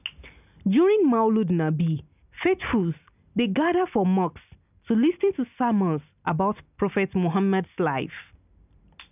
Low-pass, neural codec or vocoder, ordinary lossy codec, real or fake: 3.6 kHz; none; none; real